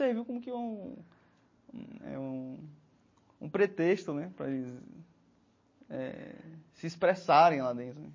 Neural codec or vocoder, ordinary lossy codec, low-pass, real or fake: none; MP3, 32 kbps; 7.2 kHz; real